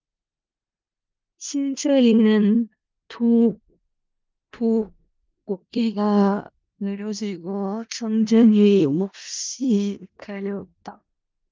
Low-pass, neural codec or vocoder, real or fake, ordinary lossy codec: 7.2 kHz; codec, 16 kHz in and 24 kHz out, 0.4 kbps, LongCat-Audio-Codec, four codebook decoder; fake; Opus, 32 kbps